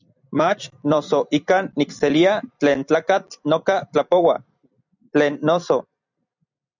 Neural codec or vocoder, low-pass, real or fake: vocoder, 44.1 kHz, 128 mel bands every 256 samples, BigVGAN v2; 7.2 kHz; fake